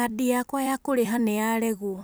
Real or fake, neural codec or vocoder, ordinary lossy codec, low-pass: fake; vocoder, 44.1 kHz, 128 mel bands every 256 samples, BigVGAN v2; none; none